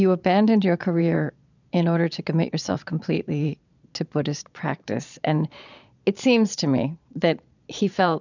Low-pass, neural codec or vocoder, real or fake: 7.2 kHz; vocoder, 22.05 kHz, 80 mel bands, Vocos; fake